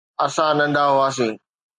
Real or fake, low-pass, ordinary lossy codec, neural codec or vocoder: real; 10.8 kHz; MP3, 96 kbps; none